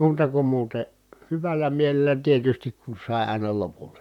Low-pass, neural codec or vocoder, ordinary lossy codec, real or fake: 19.8 kHz; vocoder, 44.1 kHz, 128 mel bands, Pupu-Vocoder; none; fake